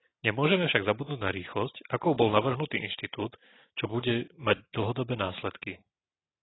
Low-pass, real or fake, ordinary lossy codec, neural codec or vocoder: 7.2 kHz; real; AAC, 16 kbps; none